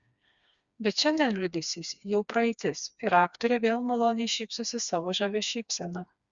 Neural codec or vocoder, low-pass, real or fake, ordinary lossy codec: codec, 16 kHz, 2 kbps, FreqCodec, smaller model; 7.2 kHz; fake; Opus, 64 kbps